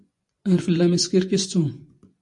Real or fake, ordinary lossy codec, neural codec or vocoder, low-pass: fake; MP3, 48 kbps; vocoder, 24 kHz, 100 mel bands, Vocos; 10.8 kHz